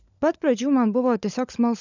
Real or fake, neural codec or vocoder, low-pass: fake; codec, 16 kHz, 16 kbps, FunCodec, trained on LibriTTS, 50 frames a second; 7.2 kHz